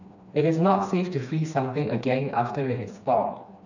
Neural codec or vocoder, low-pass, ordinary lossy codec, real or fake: codec, 16 kHz, 2 kbps, FreqCodec, smaller model; 7.2 kHz; none; fake